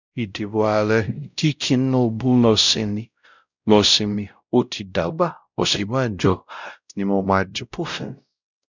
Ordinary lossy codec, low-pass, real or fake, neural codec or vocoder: none; 7.2 kHz; fake; codec, 16 kHz, 0.5 kbps, X-Codec, WavLM features, trained on Multilingual LibriSpeech